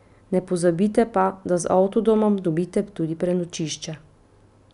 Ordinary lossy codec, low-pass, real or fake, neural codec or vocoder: none; 10.8 kHz; real; none